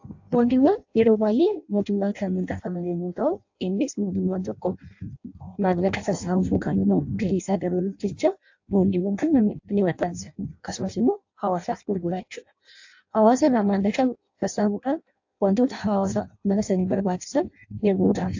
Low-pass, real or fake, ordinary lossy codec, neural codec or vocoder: 7.2 kHz; fake; AAC, 48 kbps; codec, 16 kHz in and 24 kHz out, 0.6 kbps, FireRedTTS-2 codec